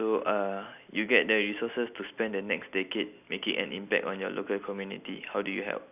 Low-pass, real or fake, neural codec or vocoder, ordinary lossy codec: 3.6 kHz; real; none; none